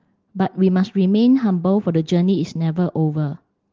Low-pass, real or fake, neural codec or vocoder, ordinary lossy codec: 7.2 kHz; real; none; Opus, 16 kbps